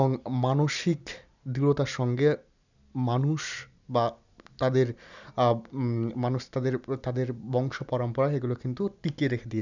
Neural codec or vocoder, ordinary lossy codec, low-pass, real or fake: none; none; 7.2 kHz; real